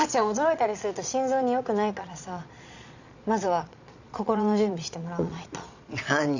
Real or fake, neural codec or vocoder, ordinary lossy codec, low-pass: fake; vocoder, 22.05 kHz, 80 mel bands, Vocos; none; 7.2 kHz